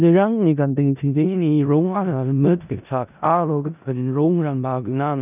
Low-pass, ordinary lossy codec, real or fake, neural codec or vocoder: 3.6 kHz; none; fake; codec, 16 kHz in and 24 kHz out, 0.4 kbps, LongCat-Audio-Codec, four codebook decoder